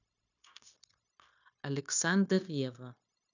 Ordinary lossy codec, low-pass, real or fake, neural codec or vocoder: none; 7.2 kHz; fake; codec, 16 kHz, 0.9 kbps, LongCat-Audio-Codec